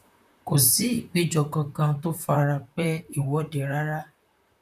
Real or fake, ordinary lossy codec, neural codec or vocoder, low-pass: fake; none; vocoder, 44.1 kHz, 128 mel bands, Pupu-Vocoder; 14.4 kHz